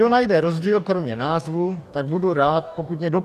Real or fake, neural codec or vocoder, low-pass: fake; codec, 44.1 kHz, 2.6 kbps, DAC; 14.4 kHz